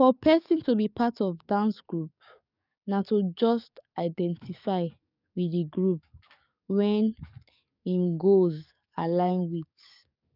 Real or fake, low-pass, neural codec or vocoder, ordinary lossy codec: fake; 5.4 kHz; codec, 44.1 kHz, 7.8 kbps, DAC; none